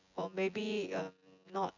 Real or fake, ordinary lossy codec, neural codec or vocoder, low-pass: fake; none; vocoder, 24 kHz, 100 mel bands, Vocos; 7.2 kHz